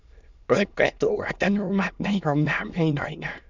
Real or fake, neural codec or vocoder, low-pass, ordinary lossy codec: fake; autoencoder, 22.05 kHz, a latent of 192 numbers a frame, VITS, trained on many speakers; 7.2 kHz; none